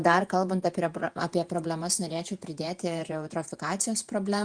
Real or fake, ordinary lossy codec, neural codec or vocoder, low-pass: fake; Opus, 32 kbps; vocoder, 48 kHz, 128 mel bands, Vocos; 9.9 kHz